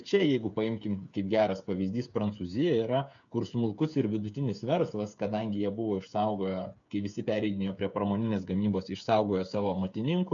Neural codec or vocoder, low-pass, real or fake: codec, 16 kHz, 8 kbps, FreqCodec, smaller model; 7.2 kHz; fake